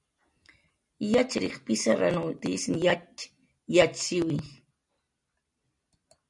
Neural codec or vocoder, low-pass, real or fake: none; 10.8 kHz; real